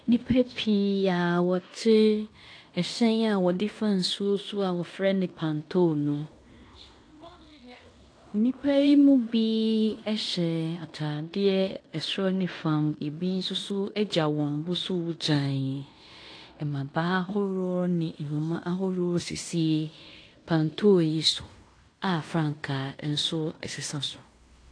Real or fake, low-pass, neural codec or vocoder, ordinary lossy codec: fake; 9.9 kHz; codec, 16 kHz in and 24 kHz out, 0.9 kbps, LongCat-Audio-Codec, fine tuned four codebook decoder; AAC, 48 kbps